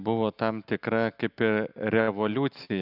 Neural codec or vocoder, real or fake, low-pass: none; real; 5.4 kHz